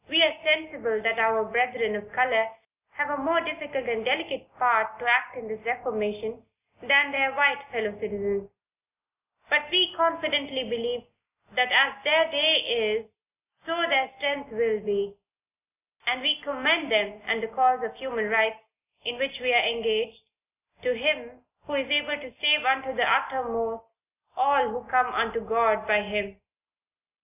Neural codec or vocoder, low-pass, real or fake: none; 3.6 kHz; real